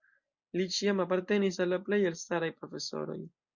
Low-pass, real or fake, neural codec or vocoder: 7.2 kHz; real; none